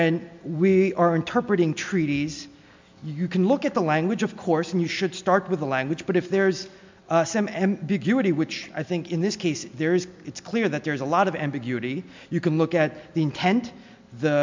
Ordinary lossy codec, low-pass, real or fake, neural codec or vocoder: MP3, 64 kbps; 7.2 kHz; real; none